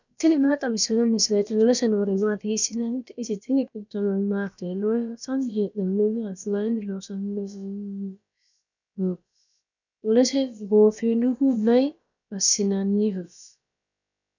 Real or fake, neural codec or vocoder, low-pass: fake; codec, 16 kHz, about 1 kbps, DyCAST, with the encoder's durations; 7.2 kHz